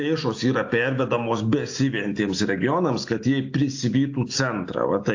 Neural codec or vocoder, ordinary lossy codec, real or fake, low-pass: none; AAC, 48 kbps; real; 7.2 kHz